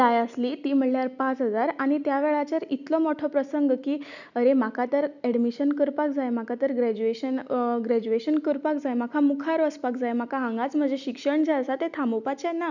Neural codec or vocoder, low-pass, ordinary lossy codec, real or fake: none; 7.2 kHz; none; real